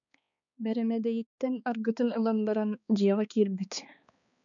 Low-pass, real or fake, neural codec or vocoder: 7.2 kHz; fake; codec, 16 kHz, 2 kbps, X-Codec, HuBERT features, trained on balanced general audio